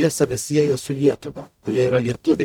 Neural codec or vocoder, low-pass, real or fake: codec, 44.1 kHz, 0.9 kbps, DAC; 19.8 kHz; fake